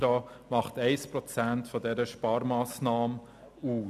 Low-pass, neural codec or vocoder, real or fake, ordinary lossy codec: 14.4 kHz; none; real; none